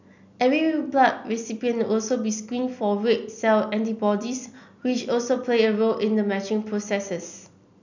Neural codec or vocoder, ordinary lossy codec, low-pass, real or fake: none; none; 7.2 kHz; real